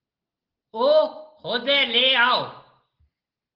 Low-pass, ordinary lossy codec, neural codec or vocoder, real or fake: 5.4 kHz; Opus, 16 kbps; none; real